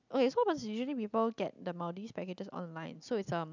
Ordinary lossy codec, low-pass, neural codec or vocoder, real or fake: none; 7.2 kHz; none; real